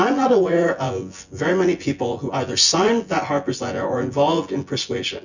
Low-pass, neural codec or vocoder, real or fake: 7.2 kHz; vocoder, 24 kHz, 100 mel bands, Vocos; fake